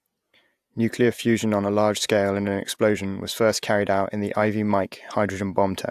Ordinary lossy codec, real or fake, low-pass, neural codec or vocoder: AAC, 96 kbps; fake; 14.4 kHz; vocoder, 48 kHz, 128 mel bands, Vocos